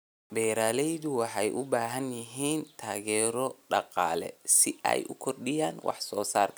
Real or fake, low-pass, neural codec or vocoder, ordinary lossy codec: real; none; none; none